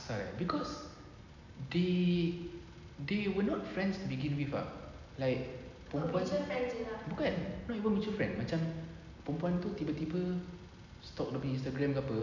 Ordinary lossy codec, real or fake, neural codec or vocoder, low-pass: none; real; none; 7.2 kHz